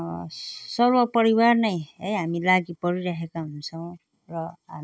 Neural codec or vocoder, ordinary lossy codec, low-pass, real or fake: none; none; none; real